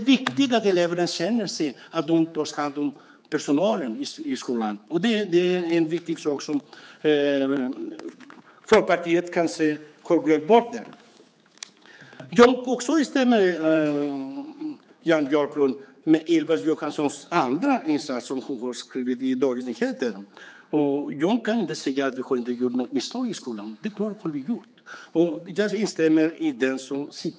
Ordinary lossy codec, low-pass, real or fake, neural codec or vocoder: none; none; fake; codec, 16 kHz, 4 kbps, X-Codec, HuBERT features, trained on general audio